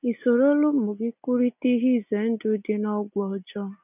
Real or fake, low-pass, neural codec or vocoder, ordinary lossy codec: real; 3.6 kHz; none; none